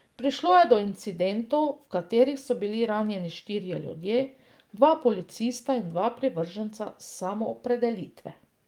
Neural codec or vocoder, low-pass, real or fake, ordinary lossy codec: codec, 44.1 kHz, 7.8 kbps, DAC; 19.8 kHz; fake; Opus, 32 kbps